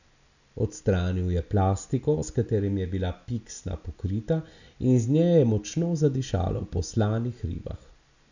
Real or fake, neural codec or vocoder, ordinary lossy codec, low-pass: real; none; none; 7.2 kHz